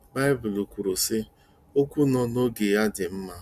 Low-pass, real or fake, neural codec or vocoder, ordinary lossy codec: 14.4 kHz; real; none; Opus, 64 kbps